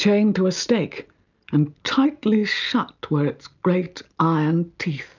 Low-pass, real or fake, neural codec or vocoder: 7.2 kHz; real; none